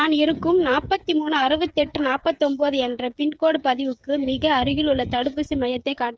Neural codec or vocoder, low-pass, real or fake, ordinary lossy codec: codec, 16 kHz, 8 kbps, FreqCodec, smaller model; none; fake; none